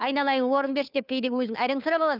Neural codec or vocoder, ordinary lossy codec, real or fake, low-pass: codec, 16 kHz, 2 kbps, FunCodec, trained on Chinese and English, 25 frames a second; none; fake; 5.4 kHz